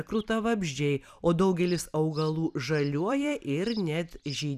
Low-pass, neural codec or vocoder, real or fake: 14.4 kHz; vocoder, 44.1 kHz, 128 mel bands every 256 samples, BigVGAN v2; fake